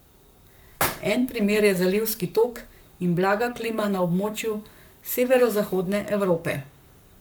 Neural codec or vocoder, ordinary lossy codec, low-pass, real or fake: codec, 44.1 kHz, 7.8 kbps, Pupu-Codec; none; none; fake